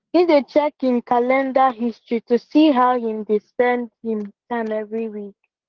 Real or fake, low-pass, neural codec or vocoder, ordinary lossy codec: fake; 7.2 kHz; codec, 16 kHz, 6 kbps, DAC; Opus, 16 kbps